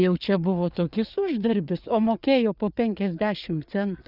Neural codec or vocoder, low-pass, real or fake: codec, 16 kHz, 4 kbps, FreqCodec, larger model; 5.4 kHz; fake